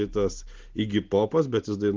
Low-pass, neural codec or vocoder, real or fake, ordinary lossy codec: 7.2 kHz; none; real; Opus, 16 kbps